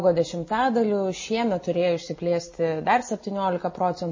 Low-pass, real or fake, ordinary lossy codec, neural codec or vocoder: 7.2 kHz; real; MP3, 32 kbps; none